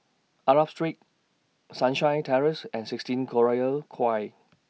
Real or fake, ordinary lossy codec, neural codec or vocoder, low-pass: real; none; none; none